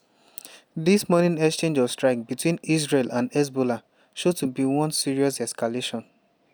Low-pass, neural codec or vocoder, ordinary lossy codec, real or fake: none; none; none; real